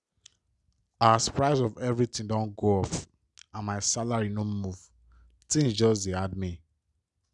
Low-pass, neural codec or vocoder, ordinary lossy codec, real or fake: 10.8 kHz; none; none; real